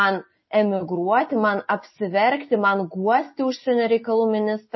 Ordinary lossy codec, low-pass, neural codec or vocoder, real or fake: MP3, 24 kbps; 7.2 kHz; none; real